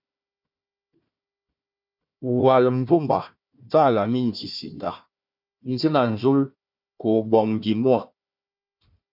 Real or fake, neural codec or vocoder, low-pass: fake; codec, 16 kHz, 1 kbps, FunCodec, trained on Chinese and English, 50 frames a second; 5.4 kHz